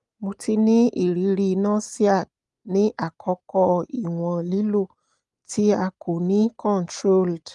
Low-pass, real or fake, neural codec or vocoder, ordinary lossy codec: 10.8 kHz; real; none; Opus, 32 kbps